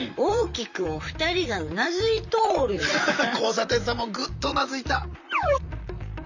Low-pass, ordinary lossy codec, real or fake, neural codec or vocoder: 7.2 kHz; none; fake; vocoder, 44.1 kHz, 128 mel bands, Pupu-Vocoder